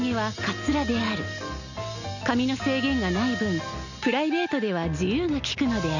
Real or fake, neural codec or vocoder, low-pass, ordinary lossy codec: real; none; 7.2 kHz; none